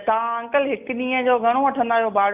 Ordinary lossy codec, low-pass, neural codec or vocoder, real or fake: none; 3.6 kHz; none; real